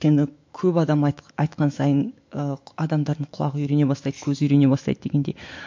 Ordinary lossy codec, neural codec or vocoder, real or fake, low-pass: MP3, 48 kbps; autoencoder, 48 kHz, 128 numbers a frame, DAC-VAE, trained on Japanese speech; fake; 7.2 kHz